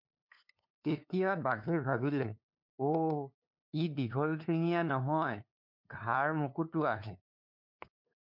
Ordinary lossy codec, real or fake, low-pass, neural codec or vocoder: MP3, 48 kbps; fake; 5.4 kHz; codec, 16 kHz, 2 kbps, FunCodec, trained on LibriTTS, 25 frames a second